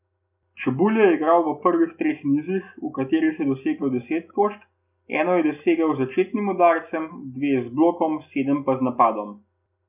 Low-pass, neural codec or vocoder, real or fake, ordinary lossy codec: 3.6 kHz; none; real; none